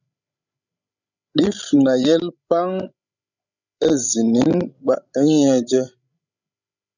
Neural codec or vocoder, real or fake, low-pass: codec, 16 kHz, 16 kbps, FreqCodec, larger model; fake; 7.2 kHz